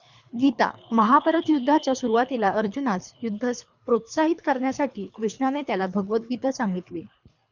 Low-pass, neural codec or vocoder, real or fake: 7.2 kHz; codec, 24 kHz, 3 kbps, HILCodec; fake